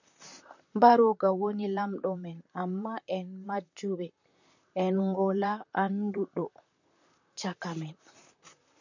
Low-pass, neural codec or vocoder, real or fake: 7.2 kHz; vocoder, 44.1 kHz, 128 mel bands, Pupu-Vocoder; fake